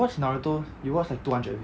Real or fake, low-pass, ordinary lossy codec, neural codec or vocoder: real; none; none; none